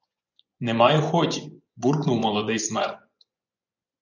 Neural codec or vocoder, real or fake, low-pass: vocoder, 24 kHz, 100 mel bands, Vocos; fake; 7.2 kHz